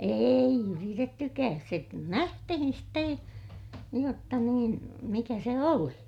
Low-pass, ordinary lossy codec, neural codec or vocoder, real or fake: 19.8 kHz; none; none; real